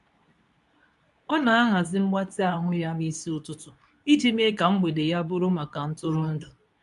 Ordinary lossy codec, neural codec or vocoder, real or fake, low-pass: AAC, 96 kbps; codec, 24 kHz, 0.9 kbps, WavTokenizer, medium speech release version 2; fake; 10.8 kHz